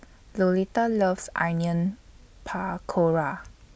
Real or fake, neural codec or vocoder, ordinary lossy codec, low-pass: real; none; none; none